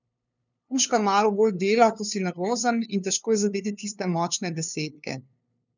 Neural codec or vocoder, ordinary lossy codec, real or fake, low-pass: codec, 16 kHz, 2 kbps, FunCodec, trained on LibriTTS, 25 frames a second; none; fake; 7.2 kHz